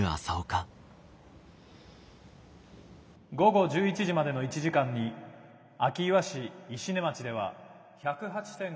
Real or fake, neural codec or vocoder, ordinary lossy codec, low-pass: real; none; none; none